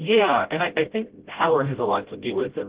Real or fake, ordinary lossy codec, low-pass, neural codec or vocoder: fake; Opus, 16 kbps; 3.6 kHz; codec, 16 kHz, 0.5 kbps, FreqCodec, smaller model